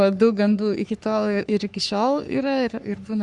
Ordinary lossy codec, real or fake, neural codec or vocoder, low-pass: Opus, 64 kbps; fake; codec, 44.1 kHz, 3.4 kbps, Pupu-Codec; 10.8 kHz